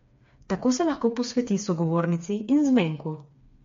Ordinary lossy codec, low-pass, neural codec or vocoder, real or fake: MP3, 48 kbps; 7.2 kHz; codec, 16 kHz, 4 kbps, FreqCodec, smaller model; fake